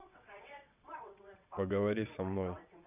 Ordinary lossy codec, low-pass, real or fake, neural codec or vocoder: none; 3.6 kHz; fake; vocoder, 22.05 kHz, 80 mel bands, WaveNeXt